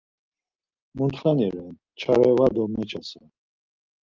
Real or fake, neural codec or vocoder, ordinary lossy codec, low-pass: real; none; Opus, 32 kbps; 7.2 kHz